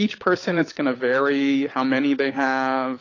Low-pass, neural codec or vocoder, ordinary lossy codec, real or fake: 7.2 kHz; codec, 16 kHz, 16 kbps, FunCodec, trained on LibriTTS, 50 frames a second; AAC, 32 kbps; fake